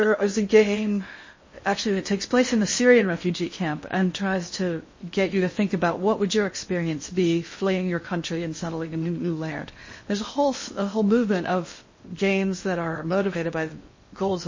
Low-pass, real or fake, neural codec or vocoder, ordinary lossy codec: 7.2 kHz; fake; codec, 16 kHz in and 24 kHz out, 0.6 kbps, FocalCodec, streaming, 4096 codes; MP3, 32 kbps